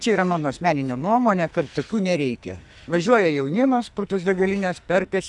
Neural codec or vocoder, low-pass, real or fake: codec, 44.1 kHz, 2.6 kbps, SNAC; 10.8 kHz; fake